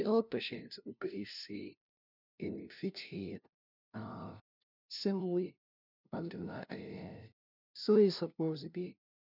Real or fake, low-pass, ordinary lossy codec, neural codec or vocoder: fake; 5.4 kHz; none; codec, 16 kHz, 0.5 kbps, FunCodec, trained on LibriTTS, 25 frames a second